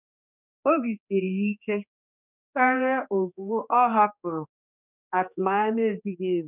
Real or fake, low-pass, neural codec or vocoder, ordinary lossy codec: fake; 3.6 kHz; codec, 16 kHz, 2 kbps, X-Codec, HuBERT features, trained on general audio; none